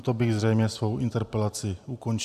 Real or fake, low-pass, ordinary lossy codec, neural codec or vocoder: fake; 14.4 kHz; AAC, 96 kbps; vocoder, 44.1 kHz, 128 mel bands every 512 samples, BigVGAN v2